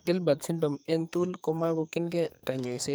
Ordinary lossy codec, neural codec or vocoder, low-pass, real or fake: none; codec, 44.1 kHz, 2.6 kbps, SNAC; none; fake